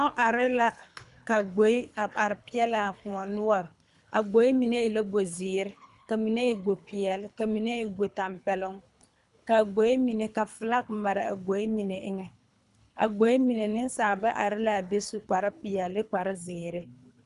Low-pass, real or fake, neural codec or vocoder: 10.8 kHz; fake; codec, 24 kHz, 3 kbps, HILCodec